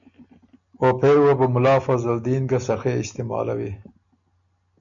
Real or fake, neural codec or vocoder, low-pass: real; none; 7.2 kHz